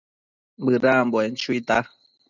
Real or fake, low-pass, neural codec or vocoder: real; 7.2 kHz; none